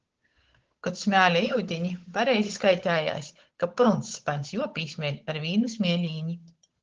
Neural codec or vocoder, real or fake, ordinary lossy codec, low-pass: codec, 16 kHz, 8 kbps, FunCodec, trained on Chinese and English, 25 frames a second; fake; Opus, 16 kbps; 7.2 kHz